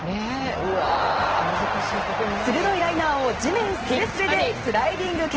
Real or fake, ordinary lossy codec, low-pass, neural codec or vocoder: real; Opus, 16 kbps; 7.2 kHz; none